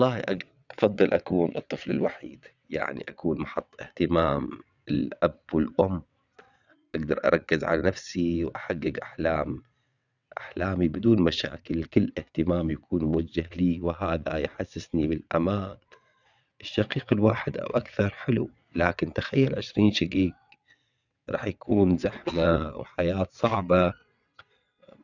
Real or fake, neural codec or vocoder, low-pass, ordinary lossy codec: fake; vocoder, 22.05 kHz, 80 mel bands, WaveNeXt; 7.2 kHz; none